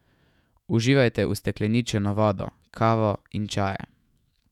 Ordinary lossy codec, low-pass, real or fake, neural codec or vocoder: none; 19.8 kHz; fake; autoencoder, 48 kHz, 128 numbers a frame, DAC-VAE, trained on Japanese speech